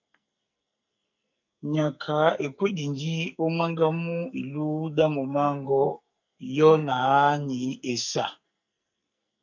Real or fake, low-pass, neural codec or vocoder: fake; 7.2 kHz; codec, 44.1 kHz, 2.6 kbps, SNAC